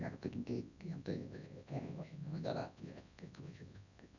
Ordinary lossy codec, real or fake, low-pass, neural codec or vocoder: none; fake; 7.2 kHz; codec, 24 kHz, 0.9 kbps, WavTokenizer, large speech release